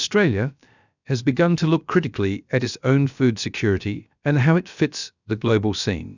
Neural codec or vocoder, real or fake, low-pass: codec, 16 kHz, about 1 kbps, DyCAST, with the encoder's durations; fake; 7.2 kHz